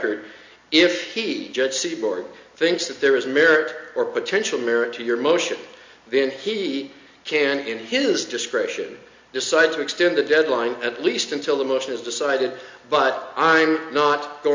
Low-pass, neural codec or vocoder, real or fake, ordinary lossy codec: 7.2 kHz; none; real; MP3, 48 kbps